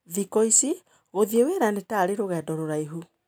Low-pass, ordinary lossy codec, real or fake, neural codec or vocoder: none; none; real; none